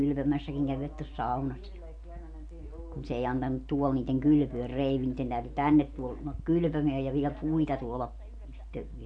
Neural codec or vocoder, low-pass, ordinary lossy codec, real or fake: none; 9.9 kHz; AAC, 64 kbps; real